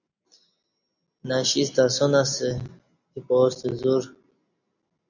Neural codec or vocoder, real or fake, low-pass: none; real; 7.2 kHz